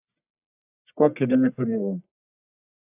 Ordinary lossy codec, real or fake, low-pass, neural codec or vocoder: AAC, 32 kbps; fake; 3.6 kHz; codec, 44.1 kHz, 1.7 kbps, Pupu-Codec